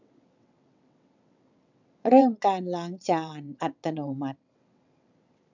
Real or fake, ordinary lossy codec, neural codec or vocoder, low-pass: fake; none; vocoder, 22.05 kHz, 80 mel bands, WaveNeXt; 7.2 kHz